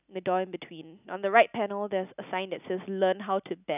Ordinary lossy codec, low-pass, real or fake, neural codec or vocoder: none; 3.6 kHz; real; none